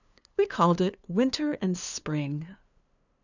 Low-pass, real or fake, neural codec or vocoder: 7.2 kHz; fake; codec, 16 kHz, 2 kbps, FunCodec, trained on LibriTTS, 25 frames a second